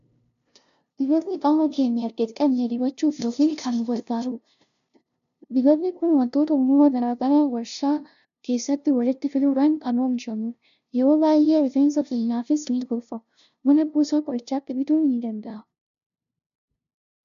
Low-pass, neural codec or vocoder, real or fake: 7.2 kHz; codec, 16 kHz, 0.5 kbps, FunCodec, trained on LibriTTS, 25 frames a second; fake